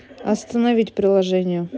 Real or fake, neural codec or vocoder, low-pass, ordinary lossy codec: real; none; none; none